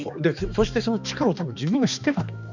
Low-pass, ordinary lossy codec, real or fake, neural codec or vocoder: 7.2 kHz; none; fake; codec, 16 kHz, 2 kbps, X-Codec, HuBERT features, trained on balanced general audio